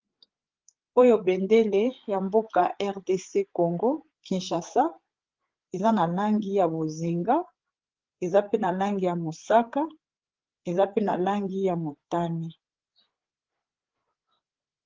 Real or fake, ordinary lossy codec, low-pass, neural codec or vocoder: fake; Opus, 16 kbps; 7.2 kHz; codec, 16 kHz, 8 kbps, FreqCodec, larger model